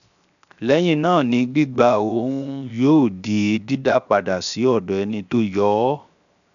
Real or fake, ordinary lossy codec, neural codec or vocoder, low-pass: fake; none; codec, 16 kHz, 0.7 kbps, FocalCodec; 7.2 kHz